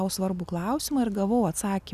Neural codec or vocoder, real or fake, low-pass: none; real; 14.4 kHz